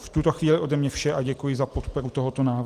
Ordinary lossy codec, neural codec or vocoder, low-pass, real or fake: Opus, 24 kbps; none; 14.4 kHz; real